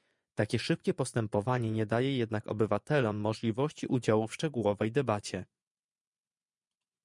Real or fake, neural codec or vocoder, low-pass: fake; vocoder, 24 kHz, 100 mel bands, Vocos; 10.8 kHz